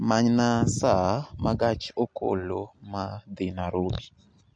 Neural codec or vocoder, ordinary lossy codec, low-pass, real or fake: none; MP3, 48 kbps; 9.9 kHz; real